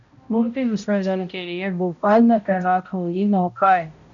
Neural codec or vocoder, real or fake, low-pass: codec, 16 kHz, 0.5 kbps, X-Codec, HuBERT features, trained on balanced general audio; fake; 7.2 kHz